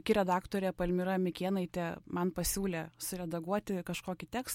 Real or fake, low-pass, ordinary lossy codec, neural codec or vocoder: real; 19.8 kHz; MP3, 64 kbps; none